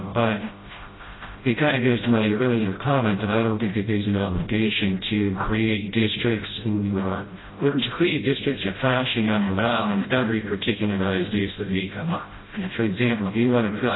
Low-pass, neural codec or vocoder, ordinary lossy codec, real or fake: 7.2 kHz; codec, 16 kHz, 0.5 kbps, FreqCodec, smaller model; AAC, 16 kbps; fake